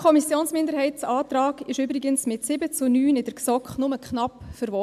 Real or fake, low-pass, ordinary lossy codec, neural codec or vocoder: real; 14.4 kHz; AAC, 96 kbps; none